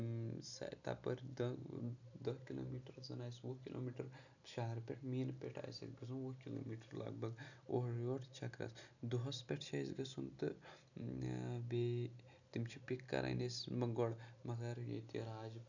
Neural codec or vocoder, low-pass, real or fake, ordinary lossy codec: none; 7.2 kHz; real; none